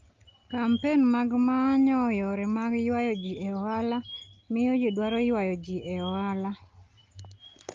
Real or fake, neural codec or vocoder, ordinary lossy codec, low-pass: real; none; Opus, 32 kbps; 7.2 kHz